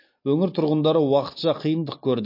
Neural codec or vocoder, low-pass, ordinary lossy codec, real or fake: none; 5.4 kHz; MP3, 32 kbps; real